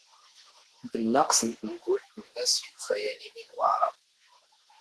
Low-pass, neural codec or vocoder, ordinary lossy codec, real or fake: 10.8 kHz; autoencoder, 48 kHz, 32 numbers a frame, DAC-VAE, trained on Japanese speech; Opus, 16 kbps; fake